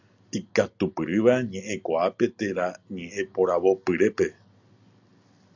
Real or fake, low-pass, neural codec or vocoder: real; 7.2 kHz; none